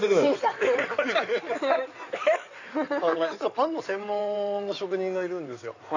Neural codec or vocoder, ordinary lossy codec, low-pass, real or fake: codec, 16 kHz in and 24 kHz out, 2.2 kbps, FireRedTTS-2 codec; none; 7.2 kHz; fake